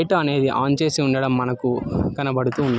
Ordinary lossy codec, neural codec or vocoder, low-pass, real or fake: none; none; none; real